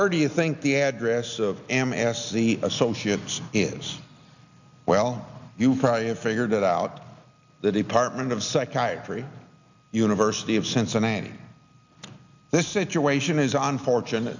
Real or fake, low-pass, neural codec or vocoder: real; 7.2 kHz; none